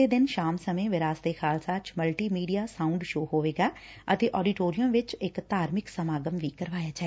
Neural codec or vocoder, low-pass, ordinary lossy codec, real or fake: none; none; none; real